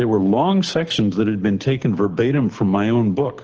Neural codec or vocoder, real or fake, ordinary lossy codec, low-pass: codec, 24 kHz, 6 kbps, HILCodec; fake; Opus, 16 kbps; 7.2 kHz